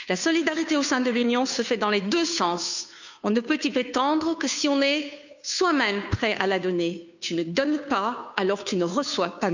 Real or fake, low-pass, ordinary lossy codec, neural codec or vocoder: fake; 7.2 kHz; none; codec, 16 kHz, 2 kbps, FunCodec, trained on Chinese and English, 25 frames a second